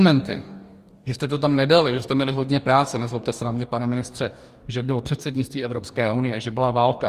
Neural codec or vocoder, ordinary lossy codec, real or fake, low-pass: codec, 44.1 kHz, 2.6 kbps, DAC; Opus, 32 kbps; fake; 14.4 kHz